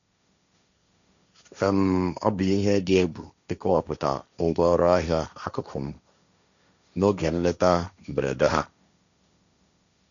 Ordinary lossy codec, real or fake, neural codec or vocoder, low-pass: none; fake; codec, 16 kHz, 1.1 kbps, Voila-Tokenizer; 7.2 kHz